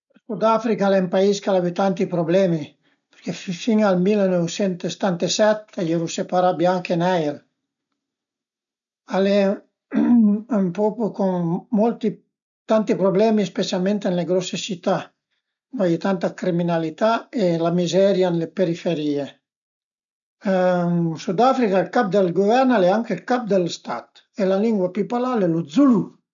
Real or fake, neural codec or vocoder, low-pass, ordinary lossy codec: real; none; 7.2 kHz; none